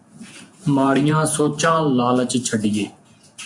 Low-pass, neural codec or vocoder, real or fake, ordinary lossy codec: 10.8 kHz; vocoder, 48 kHz, 128 mel bands, Vocos; fake; MP3, 64 kbps